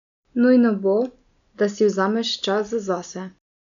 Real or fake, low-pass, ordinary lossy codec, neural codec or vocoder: real; 7.2 kHz; none; none